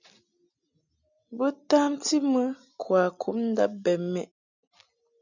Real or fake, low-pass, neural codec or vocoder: real; 7.2 kHz; none